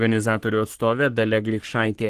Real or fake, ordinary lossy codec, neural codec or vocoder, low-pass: fake; Opus, 16 kbps; codec, 44.1 kHz, 3.4 kbps, Pupu-Codec; 14.4 kHz